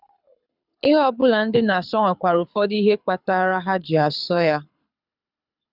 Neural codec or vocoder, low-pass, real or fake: codec, 24 kHz, 6 kbps, HILCodec; 5.4 kHz; fake